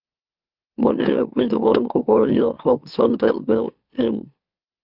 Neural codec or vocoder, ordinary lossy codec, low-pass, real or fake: autoencoder, 44.1 kHz, a latent of 192 numbers a frame, MeloTTS; Opus, 24 kbps; 5.4 kHz; fake